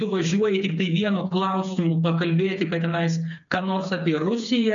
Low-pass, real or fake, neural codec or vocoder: 7.2 kHz; fake; codec, 16 kHz, 4 kbps, FreqCodec, smaller model